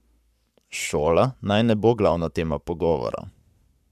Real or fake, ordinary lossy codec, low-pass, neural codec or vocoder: fake; none; 14.4 kHz; codec, 44.1 kHz, 7.8 kbps, Pupu-Codec